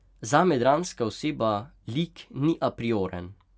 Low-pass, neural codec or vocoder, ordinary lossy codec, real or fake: none; none; none; real